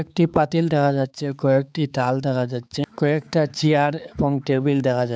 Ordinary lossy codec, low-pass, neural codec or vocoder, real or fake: none; none; codec, 16 kHz, 4 kbps, X-Codec, HuBERT features, trained on balanced general audio; fake